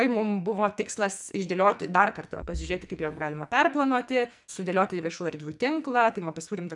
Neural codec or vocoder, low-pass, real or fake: codec, 44.1 kHz, 2.6 kbps, SNAC; 10.8 kHz; fake